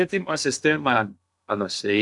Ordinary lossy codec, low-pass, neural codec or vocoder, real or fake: MP3, 96 kbps; 10.8 kHz; codec, 16 kHz in and 24 kHz out, 0.6 kbps, FocalCodec, streaming, 2048 codes; fake